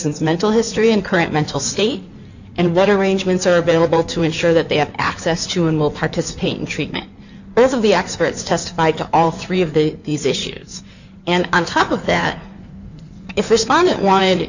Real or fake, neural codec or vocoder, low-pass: fake; codec, 16 kHz in and 24 kHz out, 2.2 kbps, FireRedTTS-2 codec; 7.2 kHz